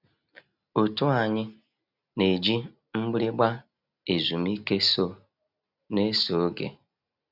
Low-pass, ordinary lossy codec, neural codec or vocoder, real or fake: 5.4 kHz; none; none; real